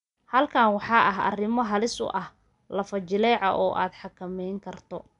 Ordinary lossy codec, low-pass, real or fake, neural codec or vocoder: none; 10.8 kHz; real; none